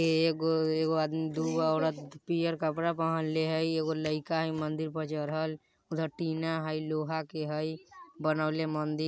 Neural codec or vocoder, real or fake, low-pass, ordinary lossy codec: none; real; none; none